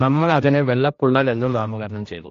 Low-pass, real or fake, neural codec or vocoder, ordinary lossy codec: 7.2 kHz; fake; codec, 16 kHz, 1 kbps, X-Codec, HuBERT features, trained on general audio; AAC, 48 kbps